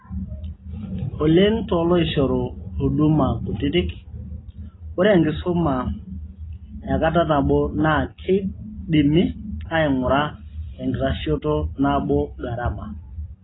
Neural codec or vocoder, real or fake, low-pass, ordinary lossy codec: none; real; 7.2 kHz; AAC, 16 kbps